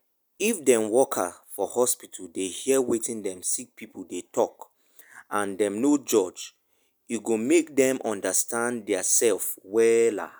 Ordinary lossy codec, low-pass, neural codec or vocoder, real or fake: none; none; none; real